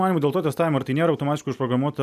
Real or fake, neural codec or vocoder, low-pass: real; none; 14.4 kHz